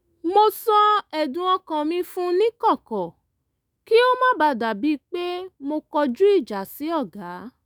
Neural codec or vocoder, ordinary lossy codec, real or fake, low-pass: autoencoder, 48 kHz, 128 numbers a frame, DAC-VAE, trained on Japanese speech; none; fake; none